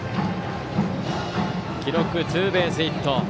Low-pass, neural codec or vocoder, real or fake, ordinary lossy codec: none; none; real; none